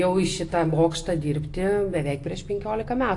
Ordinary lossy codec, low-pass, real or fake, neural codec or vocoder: AAC, 48 kbps; 10.8 kHz; real; none